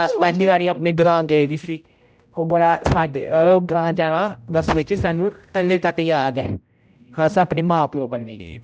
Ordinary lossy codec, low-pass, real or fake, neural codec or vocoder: none; none; fake; codec, 16 kHz, 0.5 kbps, X-Codec, HuBERT features, trained on general audio